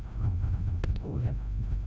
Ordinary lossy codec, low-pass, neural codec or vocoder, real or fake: none; none; codec, 16 kHz, 0.5 kbps, FreqCodec, larger model; fake